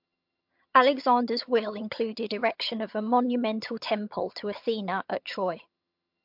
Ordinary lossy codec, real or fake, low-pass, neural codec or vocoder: MP3, 48 kbps; fake; 5.4 kHz; vocoder, 22.05 kHz, 80 mel bands, HiFi-GAN